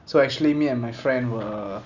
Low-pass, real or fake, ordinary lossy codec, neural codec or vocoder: 7.2 kHz; real; none; none